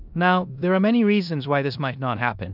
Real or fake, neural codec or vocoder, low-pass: fake; codec, 16 kHz in and 24 kHz out, 0.9 kbps, LongCat-Audio-Codec, four codebook decoder; 5.4 kHz